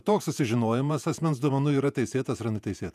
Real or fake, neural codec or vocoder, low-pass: fake; vocoder, 48 kHz, 128 mel bands, Vocos; 14.4 kHz